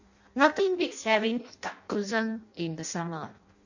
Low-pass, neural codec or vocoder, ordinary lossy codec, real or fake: 7.2 kHz; codec, 16 kHz in and 24 kHz out, 0.6 kbps, FireRedTTS-2 codec; none; fake